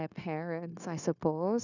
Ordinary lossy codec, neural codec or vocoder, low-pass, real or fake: none; codec, 16 kHz, 0.9 kbps, LongCat-Audio-Codec; 7.2 kHz; fake